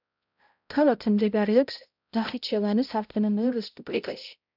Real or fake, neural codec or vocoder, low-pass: fake; codec, 16 kHz, 0.5 kbps, X-Codec, HuBERT features, trained on balanced general audio; 5.4 kHz